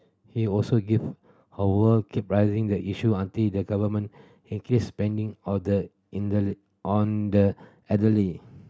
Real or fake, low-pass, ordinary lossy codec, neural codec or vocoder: real; none; none; none